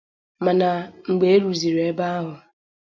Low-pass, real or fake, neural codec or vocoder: 7.2 kHz; real; none